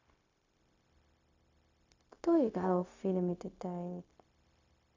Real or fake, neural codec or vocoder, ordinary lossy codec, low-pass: fake; codec, 16 kHz, 0.4 kbps, LongCat-Audio-Codec; MP3, 64 kbps; 7.2 kHz